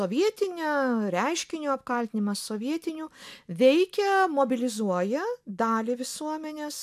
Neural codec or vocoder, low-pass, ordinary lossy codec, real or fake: none; 14.4 kHz; AAC, 96 kbps; real